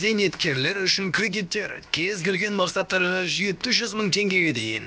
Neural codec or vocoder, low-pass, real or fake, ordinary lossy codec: codec, 16 kHz, about 1 kbps, DyCAST, with the encoder's durations; none; fake; none